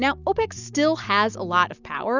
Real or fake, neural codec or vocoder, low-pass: real; none; 7.2 kHz